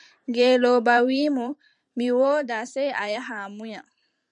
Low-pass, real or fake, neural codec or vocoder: 10.8 kHz; fake; vocoder, 44.1 kHz, 128 mel bands every 512 samples, BigVGAN v2